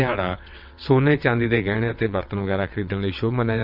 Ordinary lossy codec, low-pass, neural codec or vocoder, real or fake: none; 5.4 kHz; vocoder, 22.05 kHz, 80 mel bands, WaveNeXt; fake